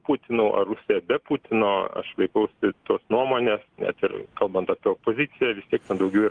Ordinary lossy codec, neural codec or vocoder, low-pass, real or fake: Opus, 32 kbps; none; 9.9 kHz; real